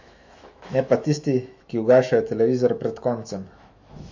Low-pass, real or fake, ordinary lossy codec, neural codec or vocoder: 7.2 kHz; fake; MP3, 48 kbps; autoencoder, 48 kHz, 128 numbers a frame, DAC-VAE, trained on Japanese speech